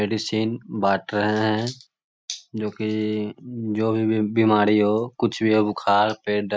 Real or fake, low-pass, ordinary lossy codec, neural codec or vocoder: real; none; none; none